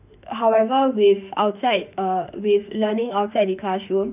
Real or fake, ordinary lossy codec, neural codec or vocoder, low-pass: fake; none; autoencoder, 48 kHz, 32 numbers a frame, DAC-VAE, trained on Japanese speech; 3.6 kHz